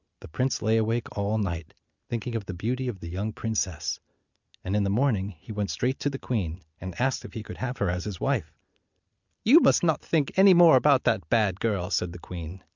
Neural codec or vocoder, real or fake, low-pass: none; real; 7.2 kHz